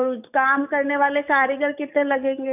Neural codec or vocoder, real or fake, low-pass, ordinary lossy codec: codec, 44.1 kHz, 7.8 kbps, DAC; fake; 3.6 kHz; none